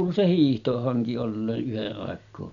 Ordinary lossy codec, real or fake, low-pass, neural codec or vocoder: none; real; 7.2 kHz; none